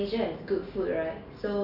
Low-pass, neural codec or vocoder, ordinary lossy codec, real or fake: 5.4 kHz; none; none; real